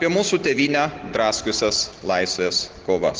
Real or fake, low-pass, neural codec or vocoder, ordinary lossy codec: real; 7.2 kHz; none; Opus, 16 kbps